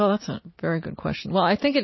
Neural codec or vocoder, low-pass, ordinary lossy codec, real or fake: none; 7.2 kHz; MP3, 24 kbps; real